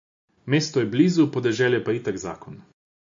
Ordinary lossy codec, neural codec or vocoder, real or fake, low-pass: MP3, 48 kbps; none; real; 7.2 kHz